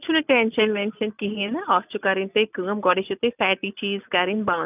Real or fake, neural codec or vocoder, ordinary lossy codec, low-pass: real; none; none; 3.6 kHz